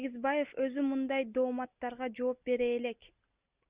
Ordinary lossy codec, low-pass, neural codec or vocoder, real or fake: Opus, 64 kbps; 3.6 kHz; none; real